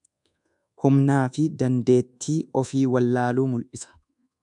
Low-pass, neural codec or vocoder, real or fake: 10.8 kHz; codec, 24 kHz, 1.2 kbps, DualCodec; fake